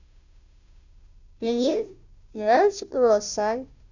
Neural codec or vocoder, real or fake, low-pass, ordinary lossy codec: codec, 16 kHz, 0.5 kbps, FunCodec, trained on Chinese and English, 25 frames a second; fake; 7.2 kHz; none